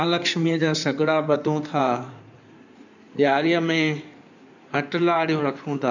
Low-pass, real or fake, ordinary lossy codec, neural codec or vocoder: 7.2 kHz; fake; none; codec, 16 kHz in and 24 kHz out, 2.2 kbps, FireRedTTS-2 codec